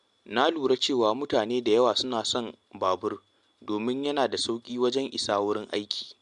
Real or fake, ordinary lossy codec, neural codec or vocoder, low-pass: real; MP3, 64 kbps; none; 10.8 kHz